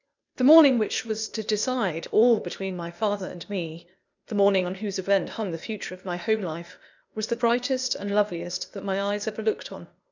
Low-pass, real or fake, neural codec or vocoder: 7.2 kHz; fake; codec, 16 kHz, 0.8 kbps, ZipCodec